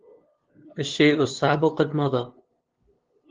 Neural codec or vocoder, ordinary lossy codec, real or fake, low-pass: codec, 16 kHz, 2 kbps, FunCodec, trained on LibriTTS, 25 frames a second; Opus, 16 kbps; fake; 7.2 kHz